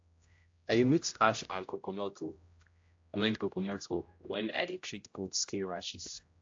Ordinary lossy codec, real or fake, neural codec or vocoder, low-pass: AAC, 48 kbps; fake; codec, 16 kHz, 0.5 kbps, X-Codec, HuBERT features, trained on general audio; 7.2 kHz